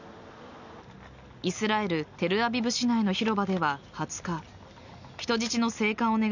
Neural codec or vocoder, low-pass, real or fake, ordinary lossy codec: none; 7.2 kHz; real; none